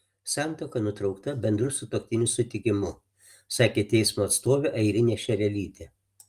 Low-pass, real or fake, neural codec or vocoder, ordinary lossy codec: 14.4 kHz; real; none; Opus, 32 kbps